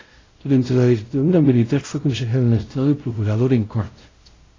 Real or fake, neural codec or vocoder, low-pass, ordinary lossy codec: fake; codec, 16 kHz, 0.5 kbps, X-Codec, WavLM features, trained on Multilingual LibriSpeech; 7.2 kHz; AAC, 32 kbps